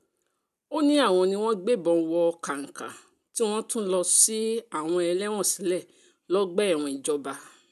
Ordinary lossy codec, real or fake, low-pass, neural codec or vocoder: none; real; 14.4 kHz; none